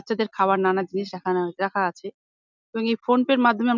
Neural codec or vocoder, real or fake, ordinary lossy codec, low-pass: none; real; none; 7.2 kHz